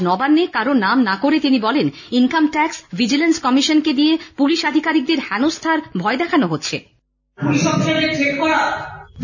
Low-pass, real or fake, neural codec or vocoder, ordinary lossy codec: 7.2 kHz; real; none; none